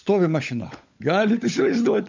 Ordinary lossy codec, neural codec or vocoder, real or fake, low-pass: MP3, 64 kbps; codec, 16 kHz, 16 kbps, FunCodec, trained on LibriTTS, 50 frames a second; fake; 7.2 kHz